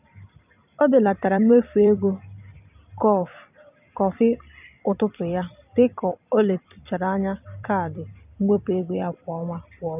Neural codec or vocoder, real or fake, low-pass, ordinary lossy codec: vocoder, 44.1 kHz, 128 mel bands every 256 samples, BigVGAN v2; fake; 3.6 kHz; none